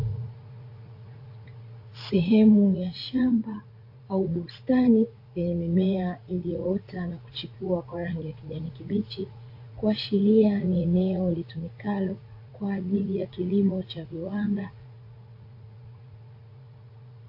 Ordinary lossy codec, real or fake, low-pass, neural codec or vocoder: AAC, 32 kbps; fake; 5.4 kHz; vocoder, 44.1 kHz, 80 mel bands, Vocos